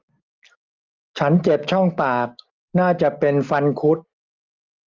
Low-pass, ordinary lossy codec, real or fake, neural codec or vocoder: 7.2 kHz; Opus, 32 kbps; real; none